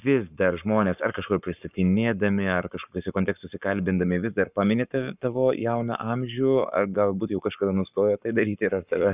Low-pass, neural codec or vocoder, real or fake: 3.6 kHz; codec, 44.1 kHz, 7.8 kbps, Pupu-Codec; fake